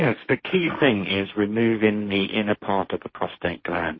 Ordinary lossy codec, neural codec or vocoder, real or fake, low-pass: MP3, 24 kbps; codec, 16 kHz, 1.1 kbps, Voila-Tokenizer; fake; 7.2 kHz